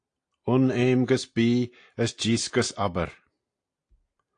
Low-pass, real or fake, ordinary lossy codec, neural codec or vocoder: 9.9 kHz; real; AAC, 48 kbps; none